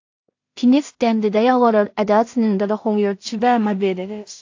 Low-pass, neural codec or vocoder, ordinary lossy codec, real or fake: 7.2 kHz; codec, 16 kHz in and 24 kHz out, 0.4 kbps, LongCat-Audio-Codec, two codebook decoder; AAC, 48 kbps; fake